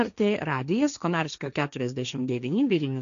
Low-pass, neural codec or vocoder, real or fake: 7.2 kHz; codec, 16 kHz, 1.1 kbps, Voila-Tokenizer; fake